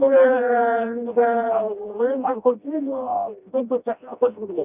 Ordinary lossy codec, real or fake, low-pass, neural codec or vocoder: none; fake; 3.6 kHz; codec, 16 kHz, 1 kbps, FreqCodec, smaller model